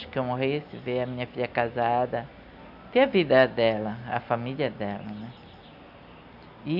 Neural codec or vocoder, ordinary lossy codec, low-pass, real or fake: none; none; 5.4 kHz; real